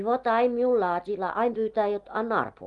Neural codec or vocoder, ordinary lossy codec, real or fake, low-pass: none; Opus, 32 kbps; real; 10.8 kHz